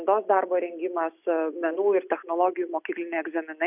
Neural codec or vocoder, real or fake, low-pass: none; real; 3.6 kHz